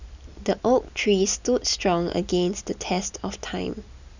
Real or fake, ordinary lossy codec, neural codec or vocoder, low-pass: real; none; none; 7.2 kHz